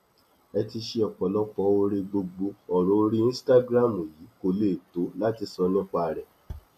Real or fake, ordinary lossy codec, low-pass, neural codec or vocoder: real; none; 14.4 kHz; none